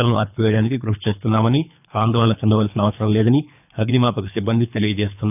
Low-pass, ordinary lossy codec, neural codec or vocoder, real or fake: 3.6 kHz; none; codec, 24 kHz, 3 kbps, HILCodec; fake